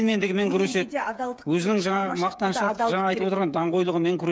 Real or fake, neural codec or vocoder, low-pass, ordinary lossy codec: fake; codec, 16 kHz, 8 kbps, FreqCodec, smaller model; none; none